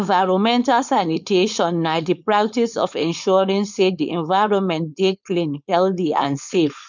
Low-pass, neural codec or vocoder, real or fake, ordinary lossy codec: 7.2 kHz; codec, 16 kHz, 4.8 kbps, FACodec; fake; none